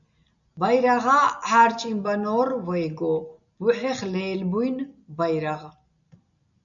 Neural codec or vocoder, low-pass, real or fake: none; 7.2 kHz; real